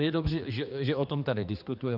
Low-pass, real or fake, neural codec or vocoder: 5.4 kHz; fake; codec, 16 kHz, 2 kbps, X-Codec, HuBERT features, trained on general audio